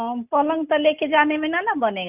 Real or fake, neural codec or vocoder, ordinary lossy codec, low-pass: real; none; none; 3.6 kHz